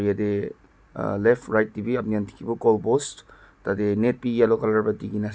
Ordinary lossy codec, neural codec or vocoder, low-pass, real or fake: none; none; none; real